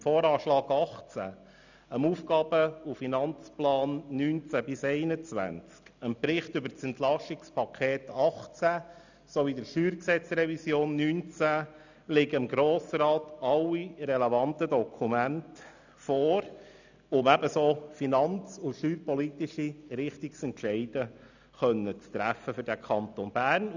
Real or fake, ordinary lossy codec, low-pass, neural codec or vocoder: real; none; 7.2 kHz; none